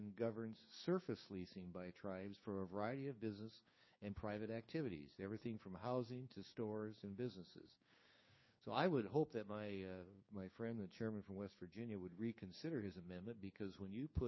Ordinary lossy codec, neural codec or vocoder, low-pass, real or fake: MP3, 24 kbps; codec, 16 kHz, 6 kbps, DAC; 7.2 kHz; fake